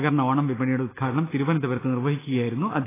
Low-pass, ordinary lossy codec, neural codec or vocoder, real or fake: 3.6 kHz; AAC, 16 kbps; none; real